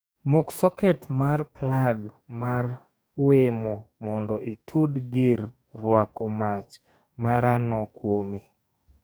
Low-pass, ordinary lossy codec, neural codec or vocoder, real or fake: none; none; codec, 44.1 kHz, 2.6 kbps, DAC; fake